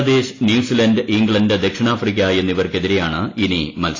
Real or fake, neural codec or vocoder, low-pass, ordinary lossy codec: real; none; 7.2 kHz; AAC, 32 kbps